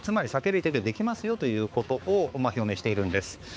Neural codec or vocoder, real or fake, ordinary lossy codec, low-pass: codec, 16 kHz, 4 kbps, X-Codec, HuBERT features, trained on balanced general audio; fake; none; none